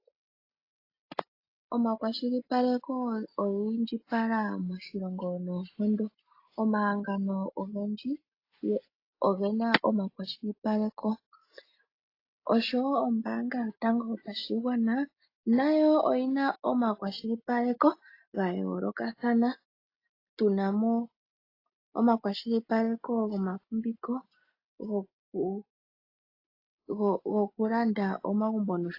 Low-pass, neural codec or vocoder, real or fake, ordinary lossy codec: 5.4 kHz; none; real; AAC, 32 kbps